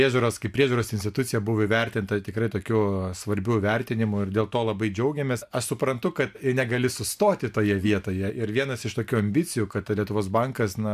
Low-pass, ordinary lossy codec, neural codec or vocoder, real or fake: 14.4 kHz; AAC, 96 kbps; none; real